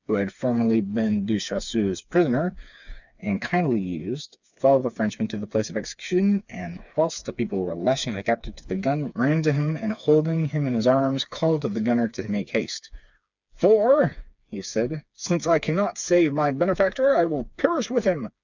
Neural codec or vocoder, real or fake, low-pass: codec, 16 kHz, 4 kbps, FreqCodec, smaller model; fake; 7.2 kHz